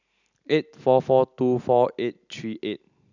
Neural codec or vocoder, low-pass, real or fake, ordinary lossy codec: none; 7.2 kHz; real; none